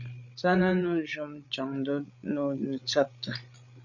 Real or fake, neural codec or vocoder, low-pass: fake; codec, 16 kHz, 8 kbps, FreqCodec, larger model; 7.2 kHz